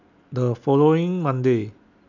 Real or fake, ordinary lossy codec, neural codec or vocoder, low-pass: real; none; none; 7.2 kHz